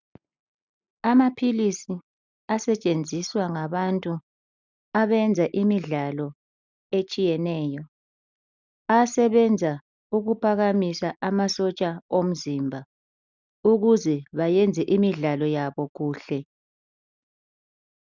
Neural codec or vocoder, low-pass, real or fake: none; 7.2 kHz; real